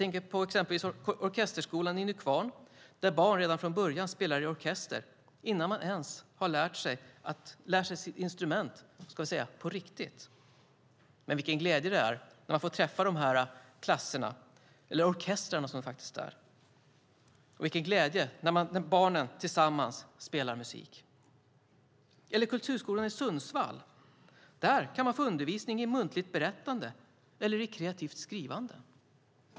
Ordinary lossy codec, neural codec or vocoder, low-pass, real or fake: none; none; none; real